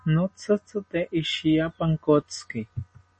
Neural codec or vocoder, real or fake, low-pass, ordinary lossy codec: none; real; 10.8 kHz; MP3, 32 kbps